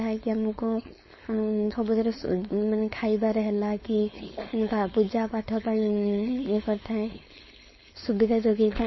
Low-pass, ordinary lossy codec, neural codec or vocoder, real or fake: 7.2 kHz; MP3, 24 kbps; codec, 16 kHz, 4.8 kbps, FACodec; fake